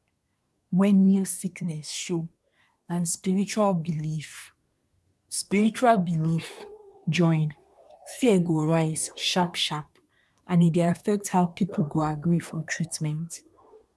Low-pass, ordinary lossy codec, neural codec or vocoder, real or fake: none; none; codec, 24 kHz, 1 kbps, SNAC; fake